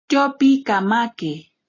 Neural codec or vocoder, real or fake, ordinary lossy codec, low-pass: none; real; AAC, 32 kbps; 7.2 kHz